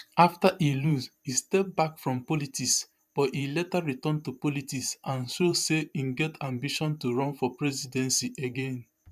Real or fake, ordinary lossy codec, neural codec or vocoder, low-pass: fake; none; vocoder, 44.1 kHz, 128 mel bands every 512 samples, BigVGAN v2; 14.4 kHz